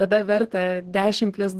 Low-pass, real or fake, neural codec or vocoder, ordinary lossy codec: 14.4 kHz; fake; codec, 32 kHz, 1.9 kbps, SNAC; Opus, 16 kbps